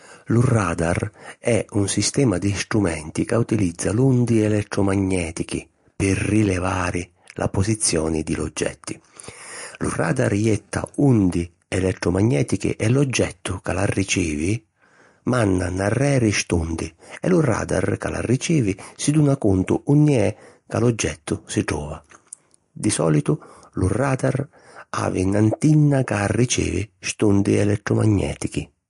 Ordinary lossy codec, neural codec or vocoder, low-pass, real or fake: MP3, 48 kbps; none; 10.8 kHz; real